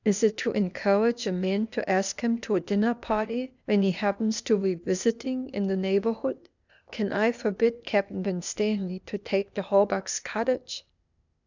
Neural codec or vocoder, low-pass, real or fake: codec, 16 kHz, 0.8 kbps, ZipCodec; 7.2 kHz; fake